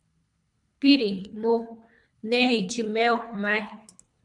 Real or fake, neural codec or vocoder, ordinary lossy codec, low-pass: fake; codec, 24 kHz, 3 kbps, HILCodec; MP3, 96 kbps; 10.8 kHz